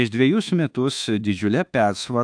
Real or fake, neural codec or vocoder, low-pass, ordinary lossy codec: fake; codec, 24 kHz, 1.2 kbps, DualCodec; 9.9 kHz; AAC, 64 kbps